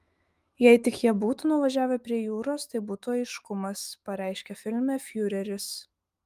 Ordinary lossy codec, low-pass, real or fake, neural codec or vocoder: Opus, 32 kbps; 14.4 kHz; fake; autoencoder, 48 kHz, 128 numbers a frame, DAC-VAE, trained on Japanese speech